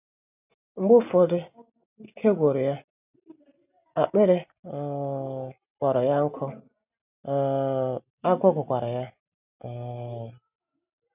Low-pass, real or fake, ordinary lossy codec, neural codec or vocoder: 3.6 kHz; real; none; none